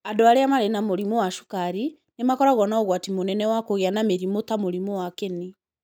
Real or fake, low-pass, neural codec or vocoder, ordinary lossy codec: real; none; none; none